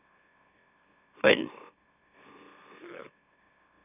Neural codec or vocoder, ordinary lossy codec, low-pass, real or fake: autoencoder, 44.1 kHz, a latent of 192 numbers a frame, MeloTTS; none; 3.6 kHz; fake